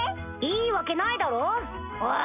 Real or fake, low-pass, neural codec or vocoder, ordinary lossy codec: real; 3.6 kHz; none; none